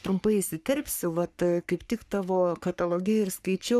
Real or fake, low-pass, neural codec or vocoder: fake; 14.4 kHz; codec, 44.1 kHz, 3.4 kbps, Pupu-Codec